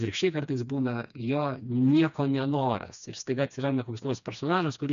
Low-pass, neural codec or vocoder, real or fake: 7.2 kHz; codec, 16 kHz, 2 kbps, FreqCodec, smaller model; fake